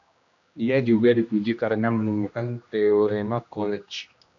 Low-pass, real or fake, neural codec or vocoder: 7.2 kHz; fake; codec, 16 kHz, 1 kbps, X-Codec, HuBERT features, trained on general audio